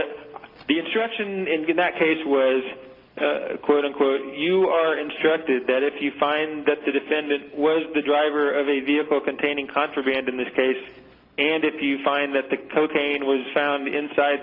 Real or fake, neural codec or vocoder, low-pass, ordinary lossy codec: real; none; 5.4 kHz; Opus, 24 kbps